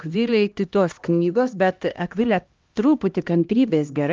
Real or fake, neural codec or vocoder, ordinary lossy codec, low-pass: fake; codec, 16 kHz, 1 kbps, X-Codec, HuBERT features, trained on LibriSpeech; Opus, 24 kbps; 7.2 kHz